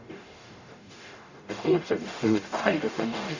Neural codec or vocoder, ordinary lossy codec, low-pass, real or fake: codec, 44.1 kHz, 0.9 kbps, DAC; none; 7.2 kHz; fake